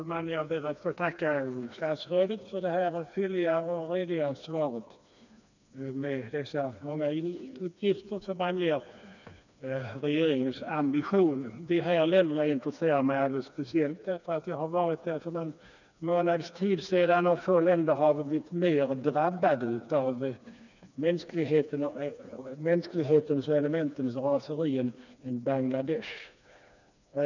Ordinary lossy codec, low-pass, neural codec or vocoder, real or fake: none; 7.2 kHz; codec, 16 kHz, 2 kbps, FreqCodec, smaller model; fake